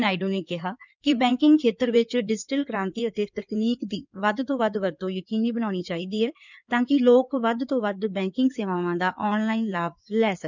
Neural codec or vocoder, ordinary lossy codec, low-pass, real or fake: codec, 16 kHz in and 24 kHz out, 2.2 kbps, FireRedTTS-2 codec; none; 7.2 kHz; fake